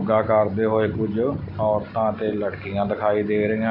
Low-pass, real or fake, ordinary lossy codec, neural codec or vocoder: 5.4 kHz; real; none; none